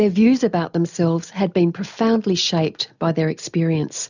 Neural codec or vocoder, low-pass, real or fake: none; 7.2 kHz; real